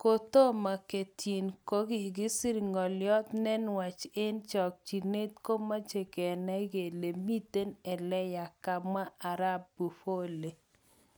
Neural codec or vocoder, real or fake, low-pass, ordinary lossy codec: none; real; none; none